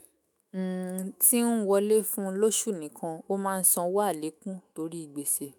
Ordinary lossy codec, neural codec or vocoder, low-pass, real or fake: none; autoencoder, 48 kHz, 128 numbers a frame, DAC-VAE, trained on Japanese speech; none; fake